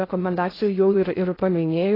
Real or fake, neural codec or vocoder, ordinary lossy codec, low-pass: fake; codec, 16 kHz in and 24 kHz out, 0.6 kbps, FocalCodec, streaming, 2048 codes; AAC, 24 kbps; 5.4 kHz